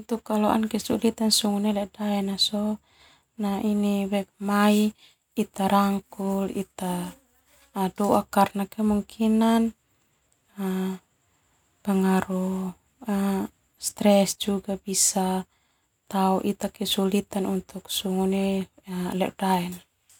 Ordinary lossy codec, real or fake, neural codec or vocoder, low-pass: none; real; none; 19.8 kHz